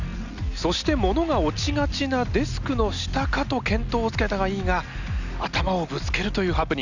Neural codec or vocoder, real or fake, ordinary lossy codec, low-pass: none; real; none; 7.2 kHz